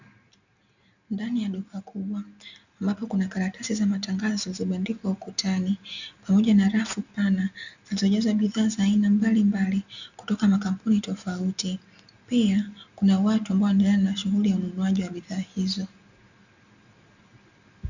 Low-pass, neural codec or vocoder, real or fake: 7.2 kHz; none; real